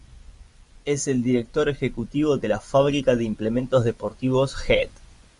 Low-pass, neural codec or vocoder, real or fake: 10.8 kHz; vocoder, 24 kHz, 100 mel bands, Vocos; fake